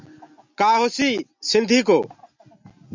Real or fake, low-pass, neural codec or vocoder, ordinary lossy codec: real; 7.2 kHz; none; MP3, 64 kbps